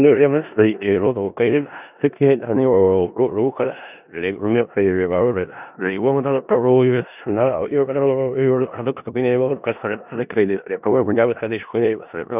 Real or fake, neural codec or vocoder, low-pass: fake; codec, 16 kHz in and 24 kHz out, 0.4 kbps, LongCat-Audio-Codec, four codebook decoder; 3.6 kHz